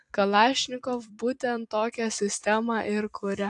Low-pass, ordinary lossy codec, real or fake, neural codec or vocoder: 10.8 kHz; AAC, 64 kbps; fake; vocoder, 44.1 kHz, 128 mel bands every 256 samples, BigVGAN v2